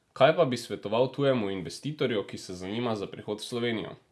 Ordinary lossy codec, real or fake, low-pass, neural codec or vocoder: none; real; none; none